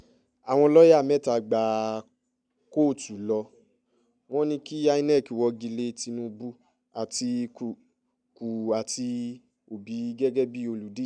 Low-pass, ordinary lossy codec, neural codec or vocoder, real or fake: 9.9 kHz; none; none; real